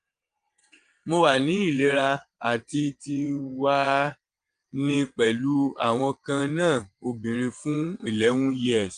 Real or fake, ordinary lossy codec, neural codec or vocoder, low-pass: fake; Opus, 32 kbps; vocoder, 22.05 kHz, 80 mel bands, WaveNeXt; 9.9 kHz